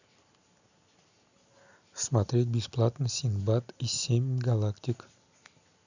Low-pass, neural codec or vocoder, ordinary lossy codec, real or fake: 7.2 kHz; none; none; real